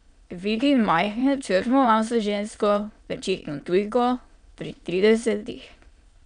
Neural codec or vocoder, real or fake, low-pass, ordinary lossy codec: autoencoder, 22.05 kHz, a latent of 192 numbers a frame, VITS, trained on many speakers; fake; 9.9 kHz; none